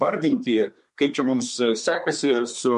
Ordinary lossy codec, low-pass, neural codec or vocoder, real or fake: MP3, 64 kbps; 10.8 kHz; codec, 24 kHz, 1 kbps, SNAC; fake